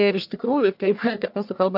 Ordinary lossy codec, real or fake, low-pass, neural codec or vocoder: AAC, 48 kbps; fake; 5.4 kHz; codec, 44.1 kHz, 1.7 kbps, Pupu-Codec